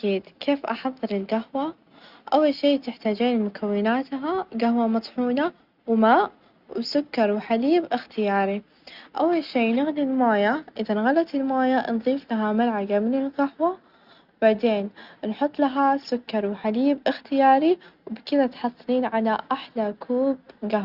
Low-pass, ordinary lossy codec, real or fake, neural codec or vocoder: 5.4 kHz; Opus, 64 kbps; real; none